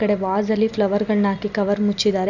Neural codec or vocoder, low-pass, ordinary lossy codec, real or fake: none; 7.2 kHz; none; real